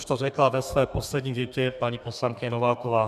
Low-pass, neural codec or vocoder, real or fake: 14.4 kHz; codec, 32 kHz, 1.9 kbps, SNAC; fake